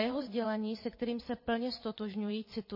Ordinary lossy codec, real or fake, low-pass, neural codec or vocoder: MP3, 24 kbps; fake; 5.4 kHz; vocoder, 22.05 kHz, 80 mel bands, WaveNeXt